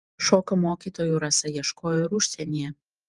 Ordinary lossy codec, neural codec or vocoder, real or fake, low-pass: Opus, 32 kbps; vocoder, 48 kHz, 128 mel bands, Vocos; fake; 10.8 kHz